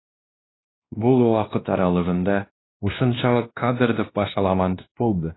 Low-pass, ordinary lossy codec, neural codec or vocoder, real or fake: 7.2 kHz; AAC, 16 kbps; codec, 16 kHz, 1 kbps, X-Codec, WavLM features, trained on Multilingual LibriSpeech; fake